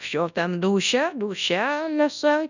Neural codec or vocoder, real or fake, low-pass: codec, 24 kHz, 0.9 kbps, WavTokenizer, large speech release; fake; 7.2 kHz